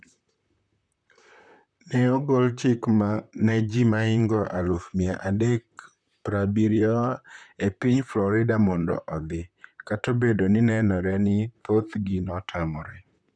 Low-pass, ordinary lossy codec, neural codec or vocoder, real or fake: 9.9 kHz; none; vocoder, 44.1 kHz, 128 mel bands, Pupu-Vocoder; fake